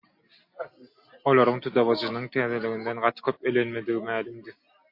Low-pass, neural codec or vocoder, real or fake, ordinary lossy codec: 5.4 kHz; none; real; MP3, 32 kbps